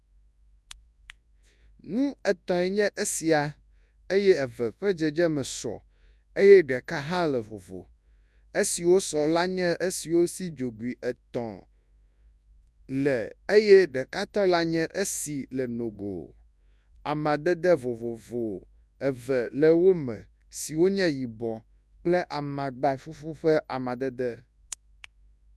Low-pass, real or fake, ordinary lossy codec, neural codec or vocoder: none; fake; none; codec, 24 kHz, 0.9 kbps, WavTokenizer, large speech release